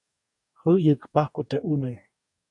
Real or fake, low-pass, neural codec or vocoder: fake; 10.8 kHz; codec, 44.1 kHz, 2.6 kbps, DAC